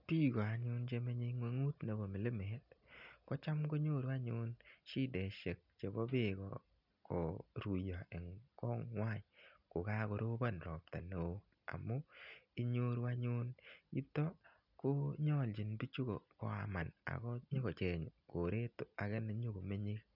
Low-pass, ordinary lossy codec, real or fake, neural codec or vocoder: 5.4 kHz; none; real; none